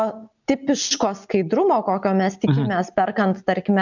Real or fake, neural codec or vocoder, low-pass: real; none; 7.2 kHz